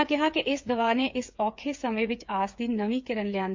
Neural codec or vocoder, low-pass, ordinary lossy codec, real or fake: codec, 16 kHz, 8 kbps, FreqCodec, smaller model; 7.2 kHz; MP3, 64 kbps; fake